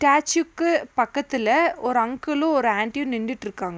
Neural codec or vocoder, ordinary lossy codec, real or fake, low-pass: none; none; real; none